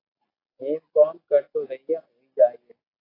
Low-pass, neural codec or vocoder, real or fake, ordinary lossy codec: 5.4 kHz; none; real; AAC, 24 kbps